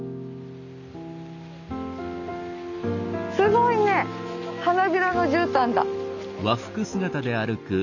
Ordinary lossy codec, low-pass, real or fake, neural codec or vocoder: none; 7.2 kHz; real; none